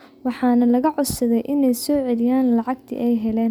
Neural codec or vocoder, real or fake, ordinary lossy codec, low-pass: none; real; none; none